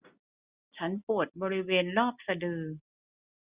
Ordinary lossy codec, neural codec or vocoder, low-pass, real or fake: Opus, 64 kbps; none; 3.6 kHz; real